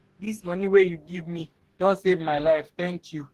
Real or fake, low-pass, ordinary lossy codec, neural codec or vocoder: fake; 14.4 kHz; Opus, 16 kbps; codec, 44.1 kHz, 2.6 kbps, DAC